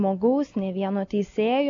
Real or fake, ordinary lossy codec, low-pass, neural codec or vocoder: real; AAC, 64 kbps; 7.2 kHz; none